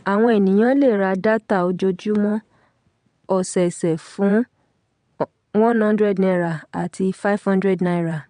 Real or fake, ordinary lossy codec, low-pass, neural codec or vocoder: fake; MP3, 64 kbps; 9.9 kHz; vocoder, 22.05 kHz, 80 mel bands, WaveNeXt